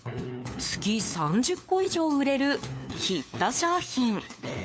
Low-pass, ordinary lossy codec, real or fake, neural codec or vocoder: none; none; fake; codec, 16 kHz, 4 kbps, FunCodec, trained on LibriTTS, 50 frames a second